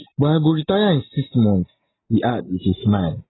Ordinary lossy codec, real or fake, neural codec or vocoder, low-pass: AAC, 16 kbps; real; none; 7.2 kHz